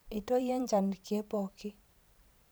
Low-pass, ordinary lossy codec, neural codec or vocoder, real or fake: none; none; vocoder, 44.1 kHz, 128 mel bands every 512 samples, BigVGAN v2; fake